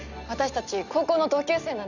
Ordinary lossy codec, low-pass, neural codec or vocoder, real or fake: none; 7.2 kHz; none; real